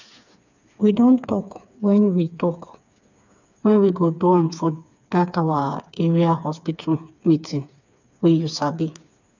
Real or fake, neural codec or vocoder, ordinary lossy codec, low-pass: fake; codec, 16 kHz, 4 kbps, FreqCodec, smaller model; none; 7.2 kHz